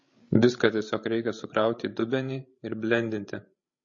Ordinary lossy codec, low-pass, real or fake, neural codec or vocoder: MP3, 32 kbps; 7.2 kHz; fake; codec, 16 kHz, 16 kbps, FreqCodec, larger model